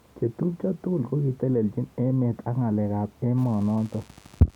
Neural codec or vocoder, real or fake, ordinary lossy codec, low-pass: none; real; none; 19.8 kHz